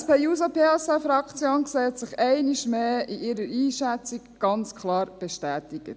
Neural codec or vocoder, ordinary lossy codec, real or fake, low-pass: none; none; real; none